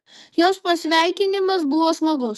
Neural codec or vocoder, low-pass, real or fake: codec, 44.1 kHz, 2.6 kbps, SNAC; 14.4 kHz; fake